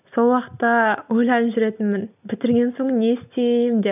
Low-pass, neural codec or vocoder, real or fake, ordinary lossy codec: 3.6 kHz; none; real; none